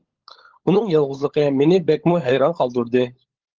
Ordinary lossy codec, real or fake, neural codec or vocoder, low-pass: Opus, 16 kbps; fake; codec, 16 kHz, 16 kbps, FunCodec, trained on LibriTTS, 50 frames a second; 7.2 kHz